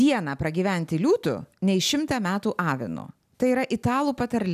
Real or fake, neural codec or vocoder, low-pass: real; none; 14.4 kHz